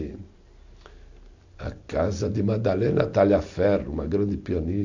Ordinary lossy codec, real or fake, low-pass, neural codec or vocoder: AAC, 48 kbps; real; 7.2 kHz; none